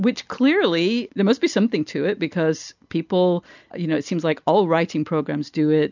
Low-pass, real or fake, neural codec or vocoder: 7.2 kHz; real; none